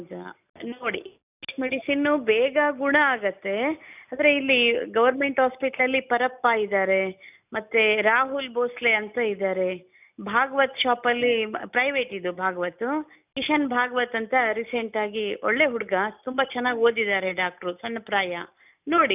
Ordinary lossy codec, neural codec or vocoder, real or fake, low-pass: none; none; real; 3.6 kHz